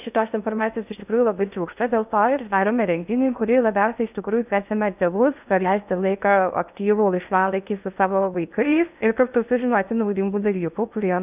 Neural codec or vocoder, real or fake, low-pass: codec, 16 kHz in and 24 kHz out, 0.6 kbps, FocalCodec, streaming, 2048 codes; fake; 3.6 kHz